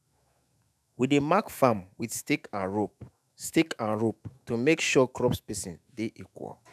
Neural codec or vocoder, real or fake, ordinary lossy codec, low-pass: autoencoder, 48 kHz, 128 numbers a frame, DAC-VAE, trained on Japanese speech; fake; none; 14.4 kHz